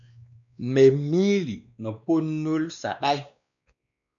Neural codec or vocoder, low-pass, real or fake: codec, 16 kHz, 2 kbps, X-Codec, WavLM features, trained on Multilingual LibriSpeech; 7.2 kHz; fake